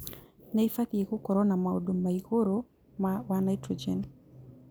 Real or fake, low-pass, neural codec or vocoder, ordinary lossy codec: real; none; none; none